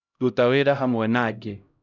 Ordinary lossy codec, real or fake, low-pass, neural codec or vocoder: none; fake; 7.2 kHz; codec, 16 kHz, 0.5 kbps, X-Codec, HuBERT features, trained on LibriSpeech